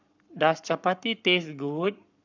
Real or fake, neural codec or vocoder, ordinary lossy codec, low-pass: fake; codec, 44.1 kHz, 7.8 kbps, Pupu-Codec; none; 7.2 kHz